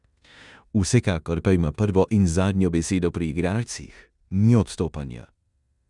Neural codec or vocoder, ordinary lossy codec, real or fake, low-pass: codec, 16 kHz in and 24 kHz out, 0.9 kbps, LongCat-Audio-Codec, four codebook decoder; none; fake; 10.8 kHz